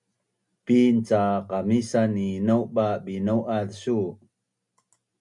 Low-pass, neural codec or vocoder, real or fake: 10.8 kHz; none; real